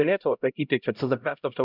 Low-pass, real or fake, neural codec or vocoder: 5.4 kHz; fake; codec, 16 kHz, 0.5 kbps, X-Codec, HuBERT features, trained on LibriSpeech